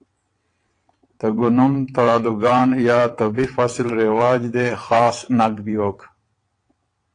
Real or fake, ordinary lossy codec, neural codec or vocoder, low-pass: fake; AAC, 48 kbps; vocoder, 22.05 kHz, 80 mel bands, WaveNeXt; 9.9 kHz